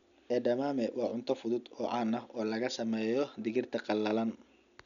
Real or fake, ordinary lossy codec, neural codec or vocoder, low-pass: real; none; none; 7.2 kHz